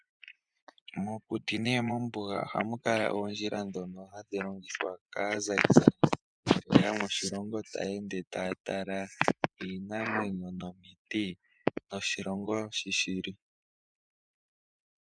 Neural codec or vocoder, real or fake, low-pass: vocoder, 24 kHz, 100 mel bands, Vocos; fake; 9.9 kHz